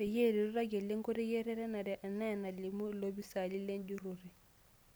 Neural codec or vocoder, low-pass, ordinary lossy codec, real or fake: none; none; none; real